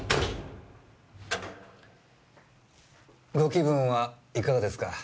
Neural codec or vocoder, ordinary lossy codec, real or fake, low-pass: none; none; real; none